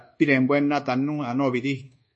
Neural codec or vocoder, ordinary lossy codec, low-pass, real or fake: codec, 24 kHz, 1.2 kbps, DualCodec; MP3, 32 kbps; 10.8 kHz; fake